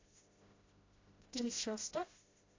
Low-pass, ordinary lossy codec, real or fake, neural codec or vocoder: 7.2 kHz; AAC, 32 kbps; fake; codec, 16 kHz, 0.5 kbps, FreqCodec, smaller model